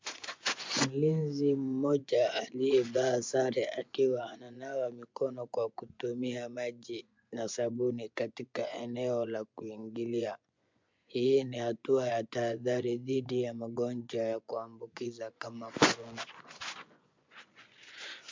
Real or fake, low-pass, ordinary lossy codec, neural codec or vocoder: fake; 7.2 kHz; MP3, 64 kbps; vocoder, 24 kHz, 100 mel bands, Vocos